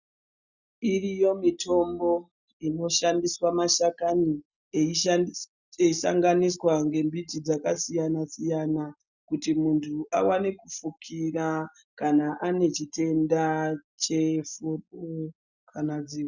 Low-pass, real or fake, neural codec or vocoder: 7.2 kHz; real; none